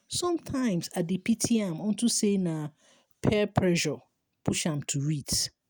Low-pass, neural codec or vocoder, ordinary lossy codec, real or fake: none; none; none; real